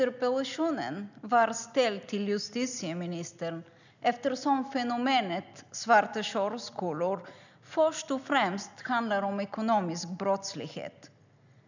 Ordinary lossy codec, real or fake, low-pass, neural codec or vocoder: none; real; 7.2 kHz; none